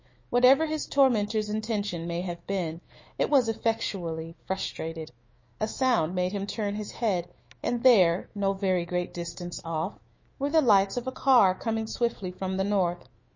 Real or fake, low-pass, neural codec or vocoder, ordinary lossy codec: fake; 7.2 kHz; autoencoder, 48 kHz, 128 numbers a frame, DAC-VAE, trained on Japanese speech; MP3, 32 kbps